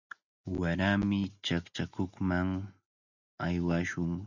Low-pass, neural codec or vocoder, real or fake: 7.2 kHz; none; real